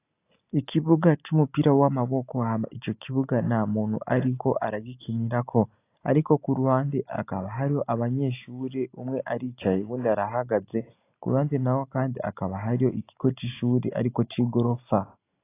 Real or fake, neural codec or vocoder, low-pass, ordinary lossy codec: real; none; 3.6 kHz; AAC, 24 kbps